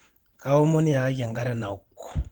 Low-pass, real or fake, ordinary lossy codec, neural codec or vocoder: 19.8 kHz; fake; Opus, 16 kbps; vocoder, 44.1 kHz, 128 mel bands every 512 samples, BigVGAN v2